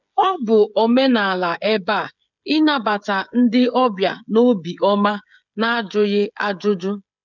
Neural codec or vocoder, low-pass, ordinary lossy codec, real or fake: codec, 16 kHz, 16 kbps, FreqCodec, smaller model; 7.2 kHz; none; fake